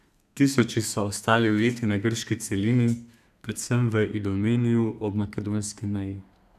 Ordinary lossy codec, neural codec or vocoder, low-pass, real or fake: none; codec, 32 kHz, 1.9 kbps, SNAC; 14.4 kHz; fake